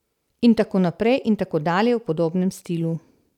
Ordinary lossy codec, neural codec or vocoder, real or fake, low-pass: none; none; real; 19.8 kHz